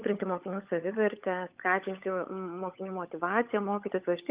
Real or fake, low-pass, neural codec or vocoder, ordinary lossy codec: fake; 3.6 kHz; codec, 16 kHz, 16 kbps, FunCodec, trained on LibriTTS, 50 frames a second; Opus, 24 kbps